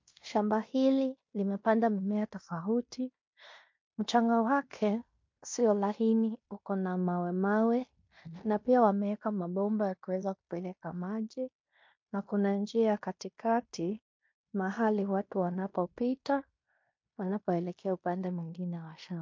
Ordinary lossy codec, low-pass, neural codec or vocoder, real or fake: MP3, 48 kbps; 7.2 kHz; codec, 16 kHz in and 24 kHz out, 0.9 kbps, LongCat-Audio-Codec, fine tuned four codebook decoder; fake